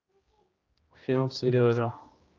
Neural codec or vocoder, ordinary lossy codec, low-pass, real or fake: codec, 16 kHz, 1 kbps, X-Codec, HuBERT features, trained on general audio; Opus, 24 kbps; 7.2 kHz; fake